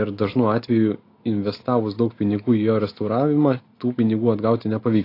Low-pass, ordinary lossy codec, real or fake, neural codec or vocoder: 5.4 kHz; AAC, 32 kbps; real; none